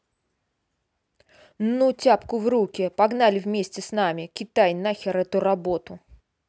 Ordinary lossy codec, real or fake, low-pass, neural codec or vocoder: none; real; none; none